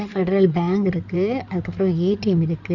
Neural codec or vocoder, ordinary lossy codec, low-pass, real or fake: codec, 16 kHz in and 24 kHz out, 2.2 kbps, FireRedTTS-2 codec; none; 7.2 kHz; fake